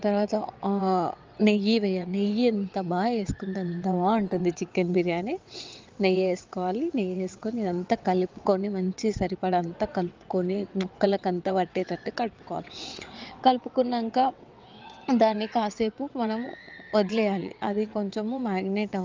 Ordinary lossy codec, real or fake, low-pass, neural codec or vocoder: Opus, 24 kbps; fake; 7.2 kHz; vocoder, 22.05 kHz, 80 mel bands, WaveNeXt